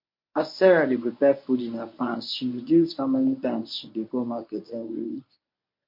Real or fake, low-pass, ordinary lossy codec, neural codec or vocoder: fake; 5.4 kHz; MP3, 32 kbps; codec, 24 kHz, 0.9 kbps, WavTokenizer, medium speech release version 1